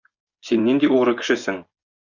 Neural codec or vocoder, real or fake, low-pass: vocoder, 24 kHz, 100 mel bands, Vocos; fake; 7.2 kHz